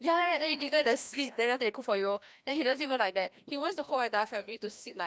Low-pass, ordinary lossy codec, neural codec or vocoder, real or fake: none; none; codec, 16 kHz, 1 kbps, FreqCodec, larger model; fake